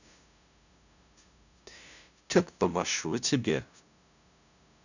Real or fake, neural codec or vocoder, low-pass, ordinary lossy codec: fake; codec, 16 kHz, 0.5 kbps, FunCodec, trained on LibriTTS, 25 frames a second; 7.2 kHz; none